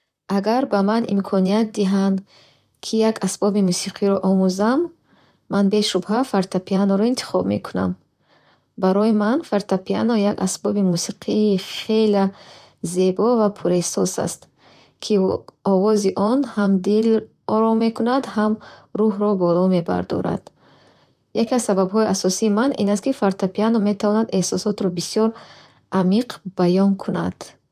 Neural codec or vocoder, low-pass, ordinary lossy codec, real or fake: vocoder, 44.1 kHz, 128 mel bands, Pupu-Vocoder; 14.4 kHz; none; fake